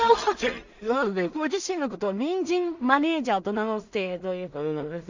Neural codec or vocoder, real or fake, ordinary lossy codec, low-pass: codec, 16 kHz in and 24 kHz out, 0.4 kbps, LongCat-Audio-Codec, two codebook decoder; fake; Opus, 64 kbps; 7.2 kHz